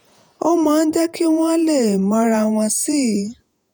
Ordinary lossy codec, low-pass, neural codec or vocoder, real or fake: none; none; vocoder, 48 kHz, 128 mel bands, Vocos; fake